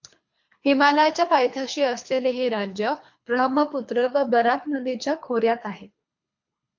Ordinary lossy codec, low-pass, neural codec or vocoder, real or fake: MP3, 64 kbps; 7.2 kHz; codec, 24 kHz, 3 kbps, HILCodec; fake